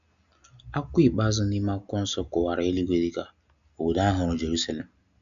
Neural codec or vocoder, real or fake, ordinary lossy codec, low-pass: none; real; none; 7.2 kHz